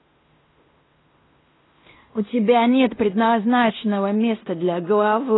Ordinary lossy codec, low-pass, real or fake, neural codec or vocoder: AAC, 16 kbps; 7.2 kHz; fake; codec, 16 kHz in and 24 kHz out, 0.9 kbps, LongCat-Audio-Codec, fine tuned four codebook decoder